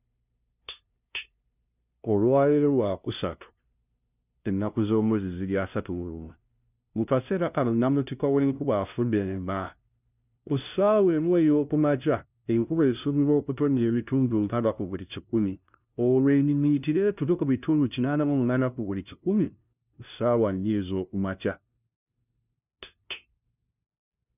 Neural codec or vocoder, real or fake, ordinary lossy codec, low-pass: codec, 16 kHz, 0.5 kbps, FunCodec, trained on LibriTTS, 25 frames a second; fake; none; 3.6 kHz